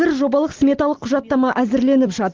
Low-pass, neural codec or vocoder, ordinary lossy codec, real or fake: 7.2 kHz; none; Opus, 16 kbps; real